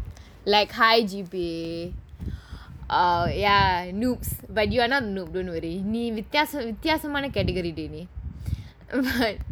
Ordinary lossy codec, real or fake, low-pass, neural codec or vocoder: none; real; none; none